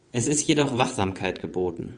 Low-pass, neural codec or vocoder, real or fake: 9.9 kHz; vocoder, 22.05 kHz, 80 mel bands, WaveNeXt; fake